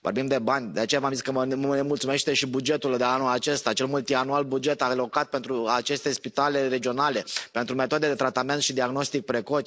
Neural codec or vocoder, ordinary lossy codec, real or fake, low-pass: none; none; real; none